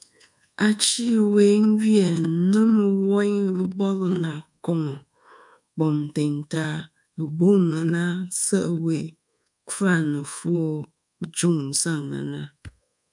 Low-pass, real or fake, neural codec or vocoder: 10.8 kHz; fake; codec, 24 kHz, 1.2 kbps, DualCodec